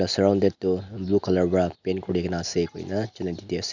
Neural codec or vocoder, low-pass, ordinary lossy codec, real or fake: none; 7.2 kHz; none; real